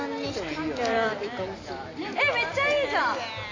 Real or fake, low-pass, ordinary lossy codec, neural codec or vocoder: real; 7.2 kHz; AAC, 32 kbps; none